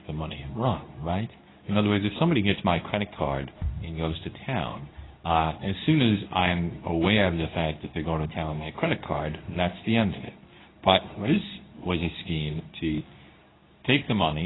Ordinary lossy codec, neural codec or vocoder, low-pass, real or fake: AAC, 16 kbps; codec, 24 kHz, 0.9 kbps, WavTokenizer, medium speech release version 2; 7.2 kHz; fake